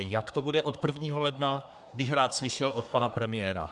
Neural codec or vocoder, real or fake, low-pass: codec, 24 kHz, 1 kbps, SNAC; fake; 10.8 kHz